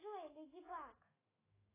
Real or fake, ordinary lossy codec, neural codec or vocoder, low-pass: fake; AAC, 16 kbps; autoencoder, 48 kHz, 128 numbers a frame, DAC-VAE, trained on Japanese speech; 3.6 kHz